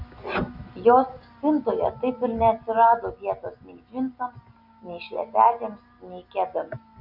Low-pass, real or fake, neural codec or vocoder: 5.4 kHz; real; none